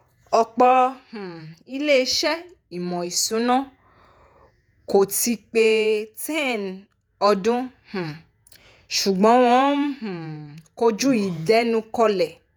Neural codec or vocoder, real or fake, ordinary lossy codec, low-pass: vocoder, 48 kHz, 128 mel bands, Vocos; fake; none; none